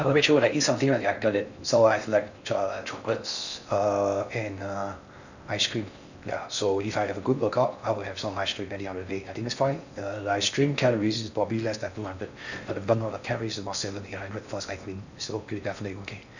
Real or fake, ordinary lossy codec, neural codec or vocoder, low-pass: fake; none; codec, 16 kHz in and 24 kHz out, 0.6 kbps, FocalCodec, streaming, 4096 codes; 7.2 kHz